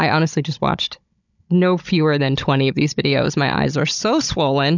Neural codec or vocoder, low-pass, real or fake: codec, 16 kHz, 16 kbps, FunCodec, trained on Chinese and English, 50 frames a second; 7.2 kHz; fake